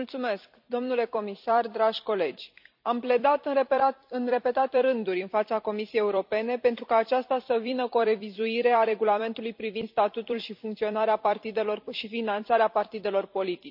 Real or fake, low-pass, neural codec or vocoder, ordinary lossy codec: fake; 5.4 kHz; vocoder, 44.1 kHz, 128 mel bands every 256 samples, BigVGAN v2; none